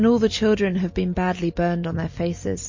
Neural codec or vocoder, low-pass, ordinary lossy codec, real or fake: none; 7.2 kHz; MP3, 32 kbps; real